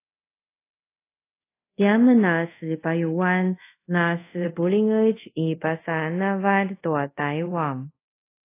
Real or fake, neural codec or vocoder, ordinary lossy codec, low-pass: fake; codec, 24 kHz, 0.5 kbps, DualCodec; AAC, 24 kbps; 3.6 kHz